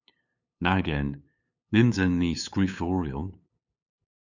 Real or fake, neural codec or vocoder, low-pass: fake; codec, 16 kHz, 8 kbps, FunCodec, trained on LibriTTS, 25 frames a second; 7.2 kHz